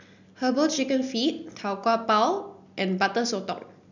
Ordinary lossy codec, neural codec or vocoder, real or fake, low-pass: none; none; real; 7.2 kHz